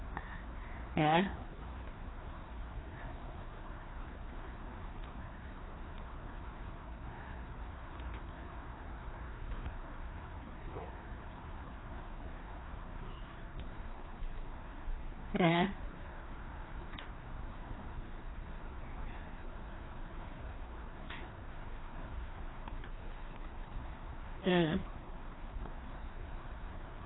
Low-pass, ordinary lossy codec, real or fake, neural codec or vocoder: 7.2 kHz; AAC, 16 kbps; fake; codec, 16 kHz, 2 kbps, FreqCodec, larger model